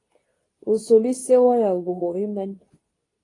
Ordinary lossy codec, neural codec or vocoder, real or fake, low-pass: AAC, 32 kbps; codec, 24 kHz, 0.9 kbps, WavTokenizer, medium speech release version 2; fake; 10.8 kHz